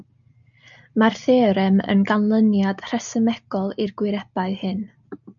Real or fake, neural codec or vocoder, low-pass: real; none; 7.2 kHz